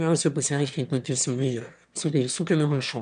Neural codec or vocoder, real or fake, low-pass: autoencoder, 22.05 kHz, a latent of 192 numbers a frame, VITS, trained on one speaker; fake; 9.9 kHz